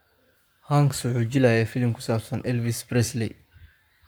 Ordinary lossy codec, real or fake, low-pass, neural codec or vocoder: none; fake; none; codec, 44.1 kHz, 7.8 kbps, Pupu-Codec